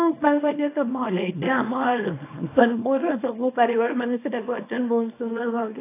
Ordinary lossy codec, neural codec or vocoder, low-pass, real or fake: AAC, 24 kbps; codec, 24 kHz, 0.9 kbps, WavTokenizer, small release; 3.6 kHz; fake